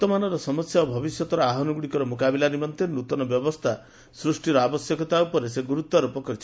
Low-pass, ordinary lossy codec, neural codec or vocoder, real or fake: none; none; none; real